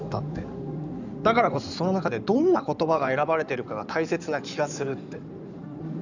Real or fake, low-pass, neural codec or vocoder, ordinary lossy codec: fake; 7.2 kHz; codec, 16 kHz in and 24 kHz out, 2.2 kbps, FireRedTTS-2 codec; none